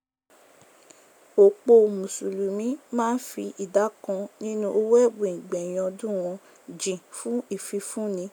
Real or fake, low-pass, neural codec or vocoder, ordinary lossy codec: real; none; none; none